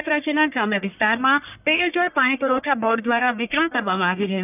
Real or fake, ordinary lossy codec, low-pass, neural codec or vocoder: fake; none; 3.6 kHz; codec, 44.1 kHz, 1.7 kbps, Pupu-Codec